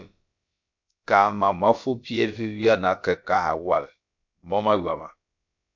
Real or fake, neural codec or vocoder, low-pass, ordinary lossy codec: fake; codec, 16 kHz, about 1 kbps, DyCAST, with the encoder's durations; 7.2 kHz; MP3, 64 kbps